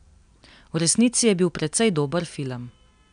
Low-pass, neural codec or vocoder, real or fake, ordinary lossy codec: 9.9 kHz; none; real; none